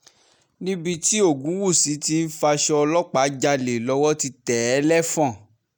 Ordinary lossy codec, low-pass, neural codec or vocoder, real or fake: none; none; none; real